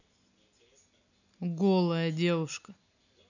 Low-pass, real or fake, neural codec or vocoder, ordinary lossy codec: 7.2 kHz; real; none; none